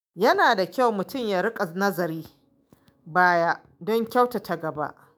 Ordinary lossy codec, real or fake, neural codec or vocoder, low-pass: none; fake; autoencoder, 48 kHz, 128 numbers a frame, DAC-VAE, trained on Japanese speech; none